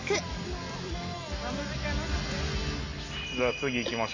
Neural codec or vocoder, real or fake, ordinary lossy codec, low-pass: none; real; AAC, 32 kbps; 7.2 kHz